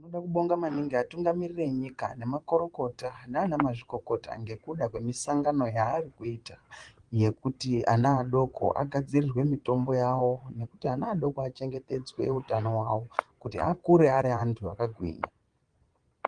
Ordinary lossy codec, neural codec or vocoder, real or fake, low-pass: Opus, 24 kbps; vocoder, 22.05 kHz, 80 mel bands, Vocos; fake; 9.9 kHz